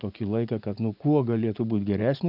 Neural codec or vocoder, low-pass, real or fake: vocoder, 44.1 kHz, 80 mel bands, Vocos; 5.4 kHz; fake